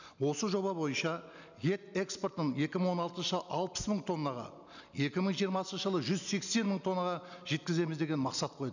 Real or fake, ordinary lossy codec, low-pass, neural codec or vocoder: real; none; 7.2 kHz; none